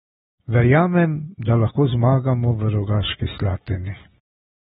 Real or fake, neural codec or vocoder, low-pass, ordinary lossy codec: real; none; 19.8 kHz; AAC, 16 kbps